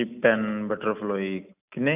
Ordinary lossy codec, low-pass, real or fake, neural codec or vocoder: none; 3.6 kHz; real; none